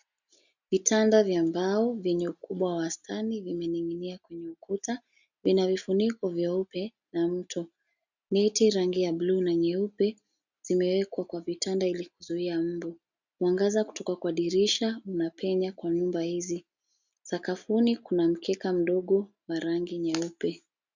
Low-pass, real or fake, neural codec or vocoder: 7.2 kHz; real; none